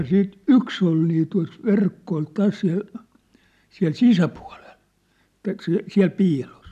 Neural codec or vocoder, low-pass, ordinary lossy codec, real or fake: none; 14.4 kHz; none; real